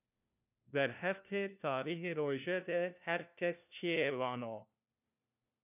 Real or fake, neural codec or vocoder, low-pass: fake; codec, 16 kHz, 1 kbps, FunCodec, trained on LibriTTS, 50 frames a second; 3.6 kHz